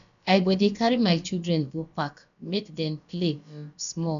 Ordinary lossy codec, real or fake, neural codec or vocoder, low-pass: none; fake; codec, 16 kHz, about 1 kbps, DyCAST, with the encoder's durations; 7.2 kHz